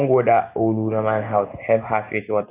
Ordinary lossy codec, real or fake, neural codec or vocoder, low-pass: none; real; none; 3.6 kHz